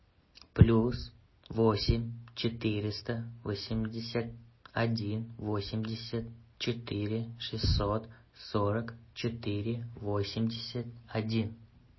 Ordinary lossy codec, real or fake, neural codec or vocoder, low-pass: MP3, 24 kbps; real; none; 7.2 kHz